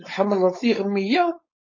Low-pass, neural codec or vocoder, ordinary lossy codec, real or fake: 7.2 kHz; codec, 16 kHz, 4.8 kbps, FACodec; MP3, 32 kbps; fake